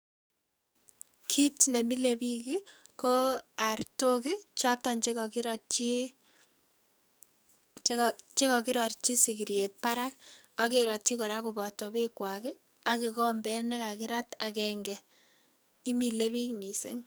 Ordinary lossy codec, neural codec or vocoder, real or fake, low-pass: none; codec, 44.1 kHz, 2.6 kbps, SNAC; fake; none